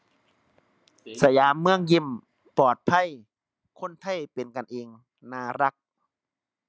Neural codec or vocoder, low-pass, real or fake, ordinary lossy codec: none; none; real; none